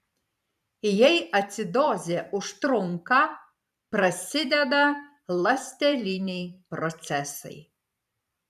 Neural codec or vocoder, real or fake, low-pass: vocoder, 44.1 kHz, 128 mel bands every 512 samples, BigVGAN v2; fake; 14.4 kHz